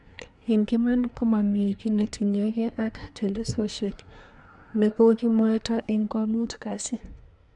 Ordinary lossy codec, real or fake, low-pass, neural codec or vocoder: none; fake; 10.8 kHz; codec, 24 kHz, 1 kbps, SNAC